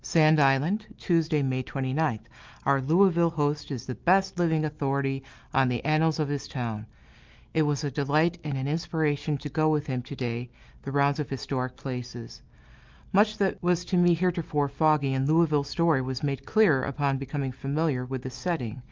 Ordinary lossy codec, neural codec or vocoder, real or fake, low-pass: Opus, 24 kbps; none; real; 7.2 kHz